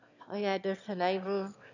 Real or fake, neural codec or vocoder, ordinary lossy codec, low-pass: fake; autoencoder, 22.05 kHz, a latent of 192 numbers a frame, VITS, trained on one speaker; none; 7.2 kHz